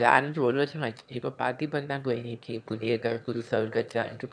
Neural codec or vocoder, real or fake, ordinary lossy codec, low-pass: autoencoder, 22.05 kHz, a latent of 192 numbers a frame, VITS, trained on one speaker; fake; none; 9.9 kHz